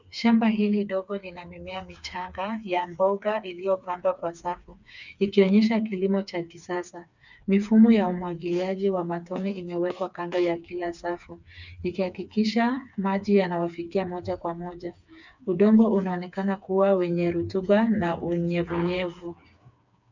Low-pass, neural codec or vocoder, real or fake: 7.2 kHz; codec, 16 kHz, 4 kbps, FreqCodec, smaller model; fake